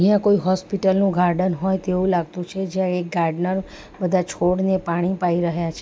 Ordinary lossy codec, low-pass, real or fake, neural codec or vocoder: none; none; real; none